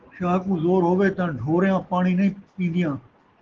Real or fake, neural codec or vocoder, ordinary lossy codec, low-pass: real; none; Opus, 16 kbps; 7.2 kHz